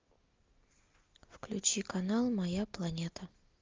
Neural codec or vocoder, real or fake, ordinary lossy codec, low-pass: none; real; Opus, 24 kbps; 7.2 kHz